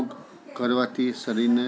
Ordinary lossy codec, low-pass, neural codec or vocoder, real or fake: none; none; none; real